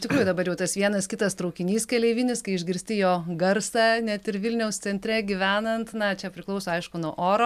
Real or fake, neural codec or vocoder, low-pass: real; none; 14.4 kHz